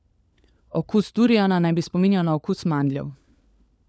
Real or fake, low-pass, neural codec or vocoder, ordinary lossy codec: fake; none; codec, 16 kHz, 4 kbps, FunCodec, trained on LibriTTS, 50 frames a second; none